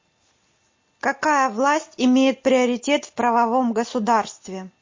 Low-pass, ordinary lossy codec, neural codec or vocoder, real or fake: 7.2 kHz; MP3, 32 kbps; none; real